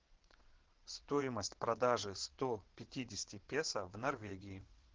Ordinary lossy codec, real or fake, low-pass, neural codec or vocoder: Opus, 16 kbps; fake; 7.2 kHz; vocoder, 22.05 kHz, 80 mel bands, WaveNeXt